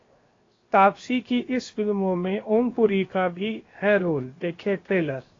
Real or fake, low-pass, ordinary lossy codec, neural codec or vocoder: fake; 7.2 kHz; AAC, 32 kbps; codec, 16 kHz, 0.7 kbps, FocalCodec